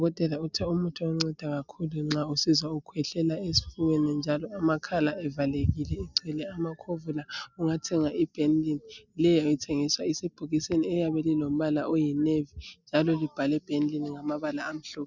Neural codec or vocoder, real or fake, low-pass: none; real; 7.2 kHz